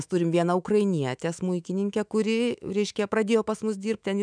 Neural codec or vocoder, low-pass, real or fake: autoencoder, 48 kHz, 128 numbers a frame, DAC-VAE, trained on Japanese speech; 9.9 kHz; fake